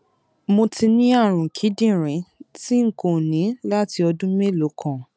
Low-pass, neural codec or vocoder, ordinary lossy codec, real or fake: none; none; none; real